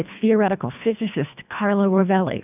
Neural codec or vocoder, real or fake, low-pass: codec, 24 kHz, 1.5 kbps, HILCodec; fake; 3.6 kHz